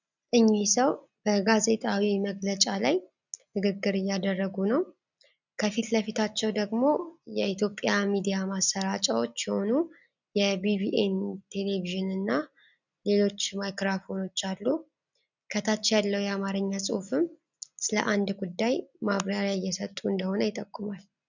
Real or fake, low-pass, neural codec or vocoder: real; 7.2 kHz; none